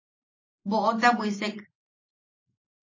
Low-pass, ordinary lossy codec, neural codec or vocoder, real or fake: 7.2 kHz; MP3, 32 kbps; none; real